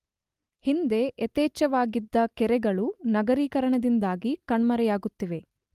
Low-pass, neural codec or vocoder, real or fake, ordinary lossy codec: 14.4 kHz; none; real; Opus, 32 kbps